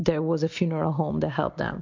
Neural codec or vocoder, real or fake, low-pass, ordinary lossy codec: none; real; 7.2 kHz; MP3, 64 kbps